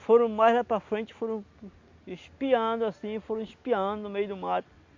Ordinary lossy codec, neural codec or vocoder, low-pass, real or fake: MP3, 48 kbps; none; 7.2 kHz; real